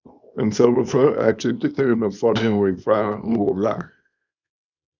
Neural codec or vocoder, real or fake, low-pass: codec, 24 kHz, 0.9 kbps, WavTokenizer, small release; fake; 7.2 kHz